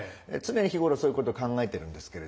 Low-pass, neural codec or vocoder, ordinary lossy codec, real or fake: none; none; none; real